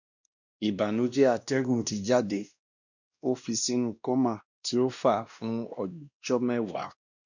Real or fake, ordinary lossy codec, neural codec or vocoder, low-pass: fake; none; codec, 16 kHz, 1 kbps, X-Codec, WavLM features, trained on Multilingual LibriSpeech; 7.2 kHz